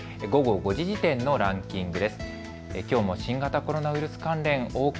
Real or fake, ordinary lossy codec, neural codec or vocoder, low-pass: real; none; none; none